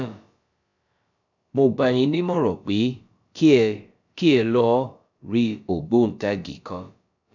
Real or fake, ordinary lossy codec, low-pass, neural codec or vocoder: fake; none; 7.2 kHz; codec, 16 kHz, about 1 kbps, DyCAST, with the encoder's durations